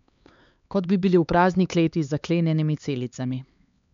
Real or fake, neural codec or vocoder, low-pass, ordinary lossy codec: fake; codec, 16 kHz, 4 kbps, X-Codec, HuBERT features, trained on LibriSpeech; 7.2 kHz; MP3, 64 kbps